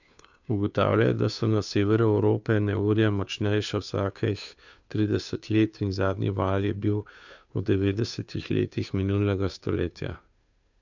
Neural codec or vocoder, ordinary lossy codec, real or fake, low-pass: codec, 16 kHz, 2 kbps, FunCodec, trained on Chinese and English, 25 frames a second; none; fake; 7.2 kHz